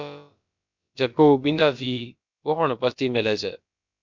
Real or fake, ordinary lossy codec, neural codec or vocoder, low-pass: fake; MP3, 64 kbps; codec, 16 kHz, about 1 kbps, DyCAST, with the encoder's durations; 7.2 kHz